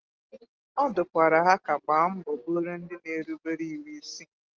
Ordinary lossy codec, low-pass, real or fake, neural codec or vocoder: Opus, 16 kbps; 7.2 kHz; real; none